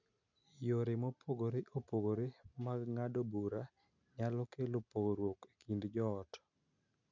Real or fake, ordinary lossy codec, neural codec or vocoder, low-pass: real; none; none; 7.2 kHz